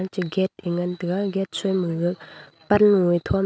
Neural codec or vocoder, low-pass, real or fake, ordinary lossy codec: none; none; real; none